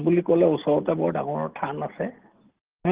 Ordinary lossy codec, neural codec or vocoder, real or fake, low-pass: Opus, 16 kbps; none; real; 3.6 kHz